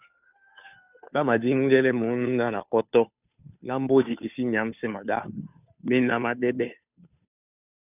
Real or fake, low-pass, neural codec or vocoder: fake; 3.6 kHz; codec, 16 kHz, 2 kbps, FunCodec, trained on Chinese and English, 25 frames a second